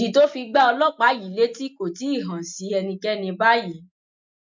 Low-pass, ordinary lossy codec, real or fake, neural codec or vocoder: 7.2 kHz; MP3, 64 kbps; real; none